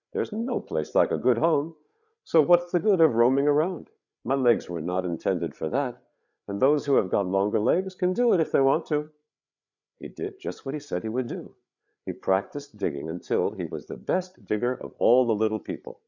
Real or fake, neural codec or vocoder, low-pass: fake; codec, 16 kHz, 8 kbps, FreqCodec, larger model; 7.2 kHz